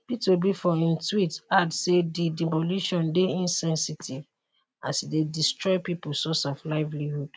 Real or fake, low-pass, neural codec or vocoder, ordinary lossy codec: real; none; none; none